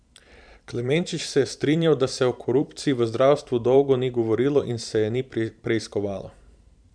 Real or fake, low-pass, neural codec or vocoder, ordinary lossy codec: fake; 9.9 kHz; vocoder, 44.1 kHz, 128 mel bands every 512 samples, BigVGAN v2; none